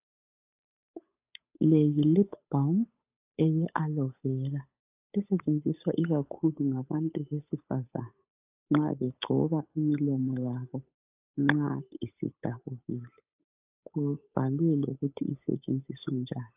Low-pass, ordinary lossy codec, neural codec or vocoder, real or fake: 3.6 kHz; AAC, 32 kbps; codec, 16 kHz, 8 kbps, FunCodec, trained on Chinese and English, 25 frames a second; fake